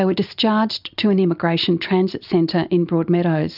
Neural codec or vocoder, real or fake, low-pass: none; real; 5.4 kHz